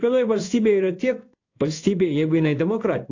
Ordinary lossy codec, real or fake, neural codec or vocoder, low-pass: AAC, 48 kbps; fake; codec, 16 kHz in and 24 kHz out, 1 kbps, XY-Tokenizer; 7.2 kHz